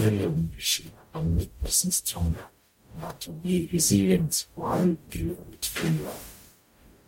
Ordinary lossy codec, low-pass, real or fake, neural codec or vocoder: MP3, 64 kbps; 19.8 kHz; fake; codec, 44.1 kHz, 0.9 kbps, DAC